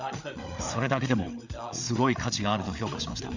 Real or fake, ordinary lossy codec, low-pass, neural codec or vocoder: fake; none; 7.2 kHz; codec, 16 kHz, 8 kbps, FreqCodec, larger model